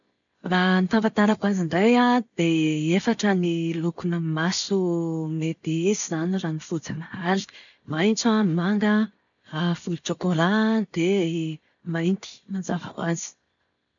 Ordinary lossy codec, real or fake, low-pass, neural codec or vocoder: none; real; 7.2 kHz; none